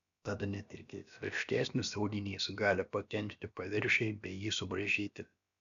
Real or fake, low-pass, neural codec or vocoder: fake; 7.2 kHz; codec, 16 kHz, about 1 kbps, DyCAST, with the encoder's durations